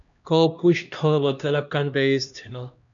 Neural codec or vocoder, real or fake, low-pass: codec, 16 kHz, 1 kbps, X-Codec, HuBERT features, trained on LibriSpeech; fake; 7.2 kHz